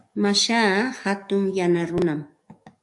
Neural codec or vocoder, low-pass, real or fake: codec, 44.1 kHz, 7.8 kbps, DAC; 10.8 kHz; fake